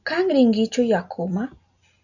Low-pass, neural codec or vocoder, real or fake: 7.2 kHz; none; real